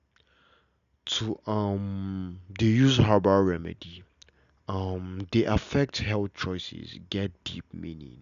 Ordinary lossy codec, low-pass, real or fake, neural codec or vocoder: none; 7.2 kHz; real; none